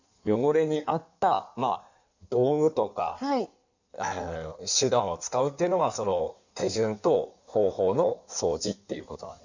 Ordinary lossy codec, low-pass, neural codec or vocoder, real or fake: none; 7.2 kHz; codec, 16 kHz in and 24 kHz out, 1.1 kbps, FireRedTTS-2 codec; fake